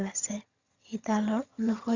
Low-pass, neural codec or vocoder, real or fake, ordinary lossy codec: 7.2 kHz; vocoder, 22.05 kHz, 80 mel bands, HiFi-GAN; fake; Opus, 64 kbps